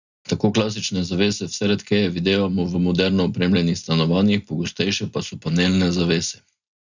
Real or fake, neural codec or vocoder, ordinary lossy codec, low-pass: real; none; none; 7.2 kHz